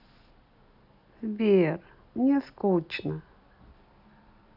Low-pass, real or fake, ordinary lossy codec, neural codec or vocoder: 5.4 kHz; real; none; none